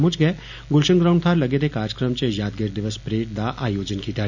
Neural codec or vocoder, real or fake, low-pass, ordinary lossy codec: none; real; 7.2 kHz; none